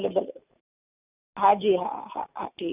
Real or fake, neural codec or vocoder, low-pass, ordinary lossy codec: real; none; 3.6 kHz; none